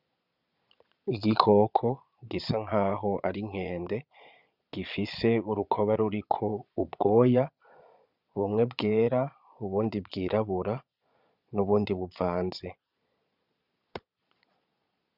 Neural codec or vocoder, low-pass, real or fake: vocoder, 22.05 kHz, 80 mel bands, Vocos; 5.4 kHz; fake